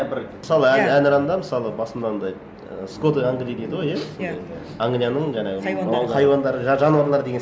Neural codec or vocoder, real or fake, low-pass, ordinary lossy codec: none; real; none; none